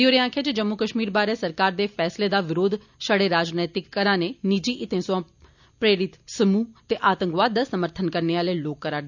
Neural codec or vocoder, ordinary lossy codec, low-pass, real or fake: none; none; 7.2 kHz; real